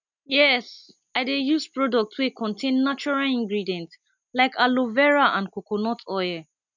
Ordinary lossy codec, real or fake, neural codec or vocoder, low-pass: none; real; none; 7.2 kHz